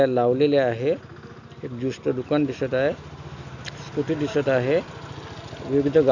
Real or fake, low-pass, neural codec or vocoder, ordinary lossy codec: real; 7.2 kHz; none; none